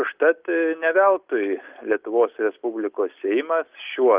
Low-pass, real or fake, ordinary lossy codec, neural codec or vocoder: 3.6 kHz; real; Opus, 32 kbps; none